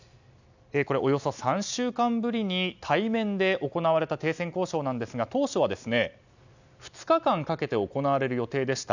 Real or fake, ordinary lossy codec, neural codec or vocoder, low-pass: real; none; none; 7.2 kHz